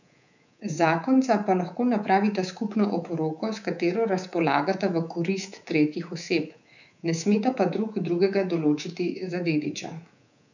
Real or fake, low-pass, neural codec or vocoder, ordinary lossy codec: fake; 7.2 kHz; codec, 24 kHz, 3.1 kbps, DualCodec; none